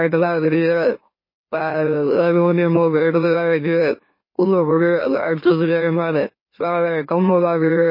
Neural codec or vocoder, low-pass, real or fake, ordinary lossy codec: autoencoder, 44.1 kHz, a latent of 192 numbers a frame, MeloTTS; 5.4 kHz; fake; MP3, 24 kbps